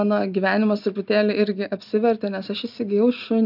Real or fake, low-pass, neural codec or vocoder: real; 5.4 kHz; none